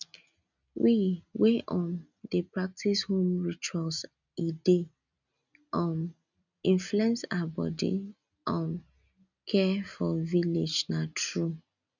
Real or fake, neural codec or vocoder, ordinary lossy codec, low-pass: real; none; none; 7.2 kHz